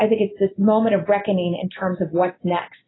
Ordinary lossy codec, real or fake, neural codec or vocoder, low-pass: AAC, 16 kbps; real; none; 7.2 kHz